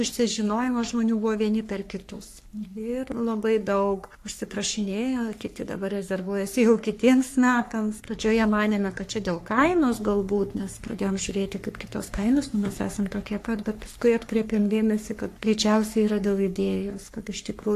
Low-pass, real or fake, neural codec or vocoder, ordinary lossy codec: 14.4 kHz; fake; codec, 44.1 kHz, 3.4 kbps, Pupu-Codec; AAC, 64 kbps